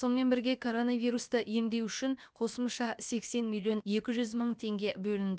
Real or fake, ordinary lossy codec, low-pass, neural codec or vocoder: fake; none; none; codec, 16 kHz, about 1 kbps, DyCAST, with the encoder's durations